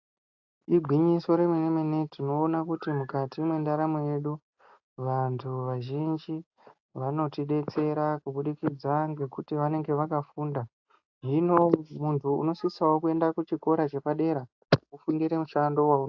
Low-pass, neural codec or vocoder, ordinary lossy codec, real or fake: 7.2 kHz; none; AAC, 48 kbps; real